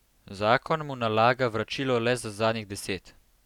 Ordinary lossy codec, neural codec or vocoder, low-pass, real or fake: none; vocoder, 44.1 kHz, 128 mel bands every 256 samples, BigVGAN v2; 19.8 kHz; fake